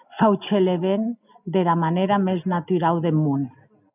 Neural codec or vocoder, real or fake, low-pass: none; real; 3.6 kHz